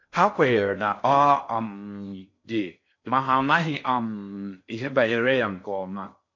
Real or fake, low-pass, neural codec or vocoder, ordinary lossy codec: fake; 7.2 kHz; codec, 16 kHz in and 24 kHz out, 0.6 kbps, FocalCodec, streaming, 4096 codes; MP3, 48 kbps